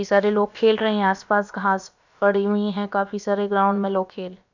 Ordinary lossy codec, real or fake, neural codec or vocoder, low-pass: none; fake; codec, 16 kHz, about 1 kbps, DyCAST, with the encoder's durations; 7.2 kHz